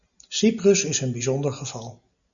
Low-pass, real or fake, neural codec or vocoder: 7.2 kHz; real; none